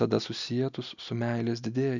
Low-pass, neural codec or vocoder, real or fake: 7.2 kHz; none; real